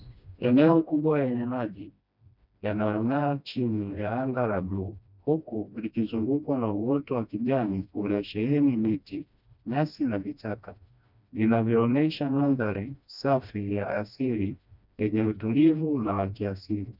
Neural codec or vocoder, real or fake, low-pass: codec, 16 kHz, 1 kbps, FreqCodec, smaller model; fake; 5.4 kHz